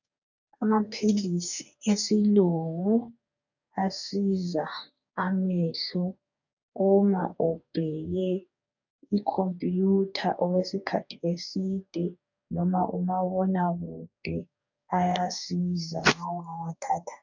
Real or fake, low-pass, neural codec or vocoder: fake; 7.2 kHz; codec, 44.1 kHz, 2.6 kbps, DAC